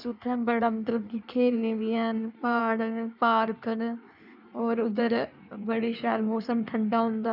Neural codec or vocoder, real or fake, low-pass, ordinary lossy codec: codec, 16 kHz in and 24 kHz out, 1.1 kbps, FireRedTTS-2 codec; fake; 5.4 kHz; none